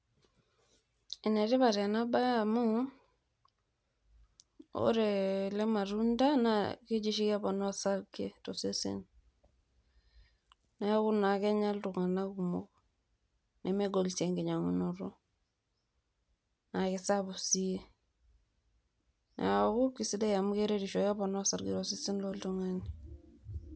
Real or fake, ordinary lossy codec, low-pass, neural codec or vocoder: real; none; none; none